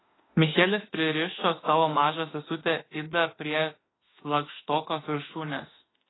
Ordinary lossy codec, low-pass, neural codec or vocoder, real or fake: AAC, 16 kbps; 7.2 kHz; autoencoder, 48 kHz, 32 numbers a frame, DAC-VAE, trained on Japanese speech; fake